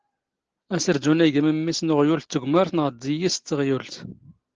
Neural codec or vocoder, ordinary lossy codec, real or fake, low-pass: none; Opus, 16 kbps; real; 7.2 kHz